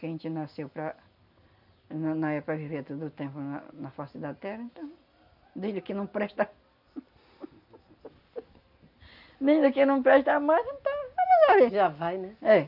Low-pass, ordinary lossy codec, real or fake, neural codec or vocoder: 5.4 kHz; none; real; none